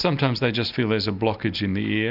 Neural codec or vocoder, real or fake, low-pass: none; real; 5.4 kHz